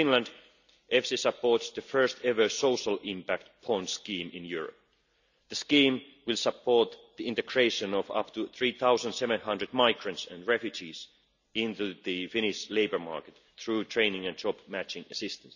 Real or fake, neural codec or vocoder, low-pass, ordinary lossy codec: real; none; 7.2 kHz; none